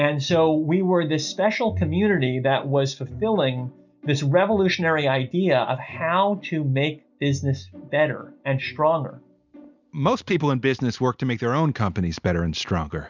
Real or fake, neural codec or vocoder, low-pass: real; none; 7.2 kHz